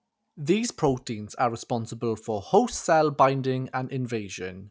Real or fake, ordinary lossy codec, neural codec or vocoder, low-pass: real; none; none; none